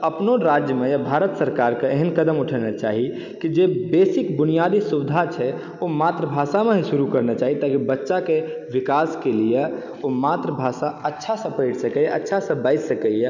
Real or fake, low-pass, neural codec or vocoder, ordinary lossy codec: real; 7.2 kHz; none; none